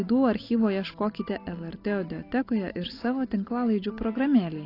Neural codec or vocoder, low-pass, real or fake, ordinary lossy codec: none; 5.4 kHz; real; AAC, 32 kbps